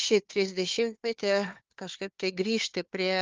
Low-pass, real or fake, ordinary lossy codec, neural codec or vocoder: 7.2 kHz; fake; Opus, 24 kbps; codec, 16 kHz, 4 kbps, FunCodec, trained on Chinese and English, 50 frames a second